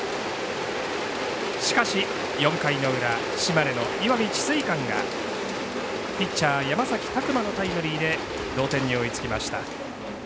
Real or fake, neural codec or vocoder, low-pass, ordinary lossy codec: real; none; none; none